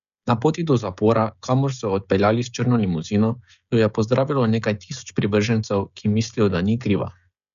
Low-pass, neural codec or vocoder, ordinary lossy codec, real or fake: 7.2 kHz; codec, 16 kHz, 16 kbps, FreqCodec, smaller model; none; fake